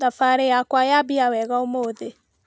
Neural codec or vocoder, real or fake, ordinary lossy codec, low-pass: none; real; none; none